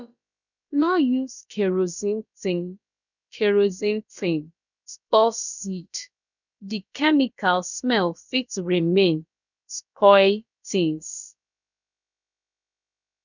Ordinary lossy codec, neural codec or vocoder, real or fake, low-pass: none; codec, 16 kHz, about 1 kbps, DyCAST, with the encoder's durations; fake; 7.2 kHz